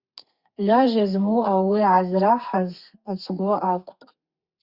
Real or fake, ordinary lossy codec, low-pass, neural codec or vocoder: fake; Opus, 64 kbps; 5.4 kHz; codec, 32 kHz, 1.9 kbps, SNAC